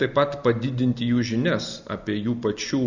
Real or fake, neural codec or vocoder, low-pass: real; none; 7.2 kHz